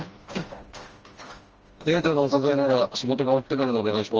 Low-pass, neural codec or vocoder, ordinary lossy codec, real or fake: 7.2 kHz; codec, 16 kHz, 1 kbps, FreqCodec, smaller model; Opus, 24 kbps; fake